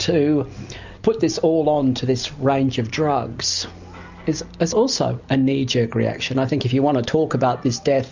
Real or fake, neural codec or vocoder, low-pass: real; none; 7.2 kHz